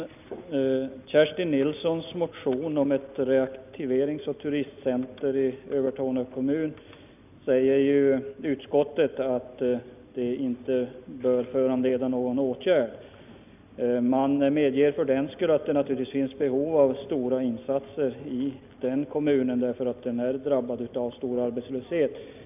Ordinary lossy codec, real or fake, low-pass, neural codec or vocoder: none; real; 3.6 kHz; none